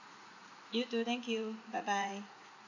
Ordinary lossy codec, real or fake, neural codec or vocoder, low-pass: none; fake; vocoder, 44.1 kHz, 80 mel bands, Vocos; 7.2 kHz